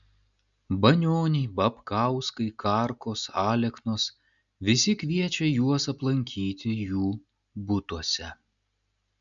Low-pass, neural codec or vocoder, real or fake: 7.2 kHz; none; real